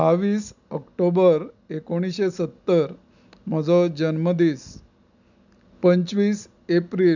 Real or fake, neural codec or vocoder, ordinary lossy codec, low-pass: real; none; none; 7.2 kHz